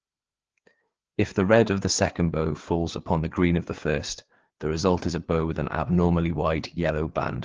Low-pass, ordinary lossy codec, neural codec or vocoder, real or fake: 7.2 kHz; Opus, 16 kbps; codec, 16 kHz, 4 kbps, FreqCodec, larger model; fake